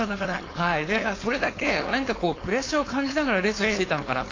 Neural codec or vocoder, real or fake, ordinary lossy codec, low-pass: codec, 16 kHz, 4.8 kbps, FACodec; fake; AAC, 32 kbps; 7.2 kHz